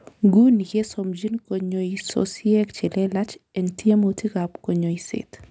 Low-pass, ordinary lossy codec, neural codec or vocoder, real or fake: none; none; none; real